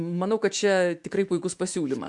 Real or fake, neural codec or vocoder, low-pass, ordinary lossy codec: fake; autoencoder, 48 kHz, 128 numbers a frame, DAC-VAE, trained on Japanese speech; 10.8 kHz; MP3, 64 kbps